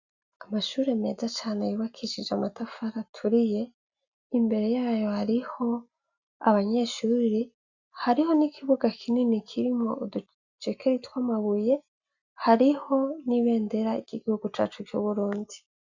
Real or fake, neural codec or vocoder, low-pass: real; none; 7.2 kHz